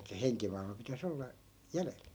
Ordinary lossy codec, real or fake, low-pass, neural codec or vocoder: none; real; none; none